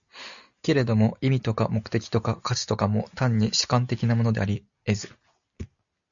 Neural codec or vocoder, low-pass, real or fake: none; 7.2 kHz; real